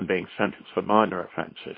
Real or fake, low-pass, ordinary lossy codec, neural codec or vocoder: fake; 5.4 kHz; MP3, 24 kbps; codec, 24 kHz, 0.9 kbps, WavTokenizer, small release